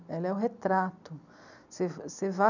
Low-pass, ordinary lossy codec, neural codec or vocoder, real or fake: 7.2 kHz; none; none; real